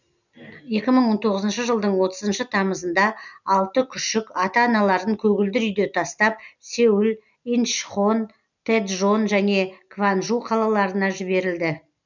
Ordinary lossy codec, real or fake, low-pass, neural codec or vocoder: none; real; 7.2 kHz; none